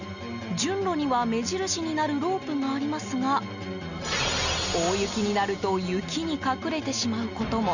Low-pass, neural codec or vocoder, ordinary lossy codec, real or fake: 7.2 kHz; none; none; real